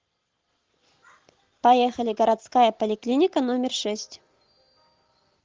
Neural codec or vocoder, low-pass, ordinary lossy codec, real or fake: none; 7.2 kHz; Opus, 16 kbps; real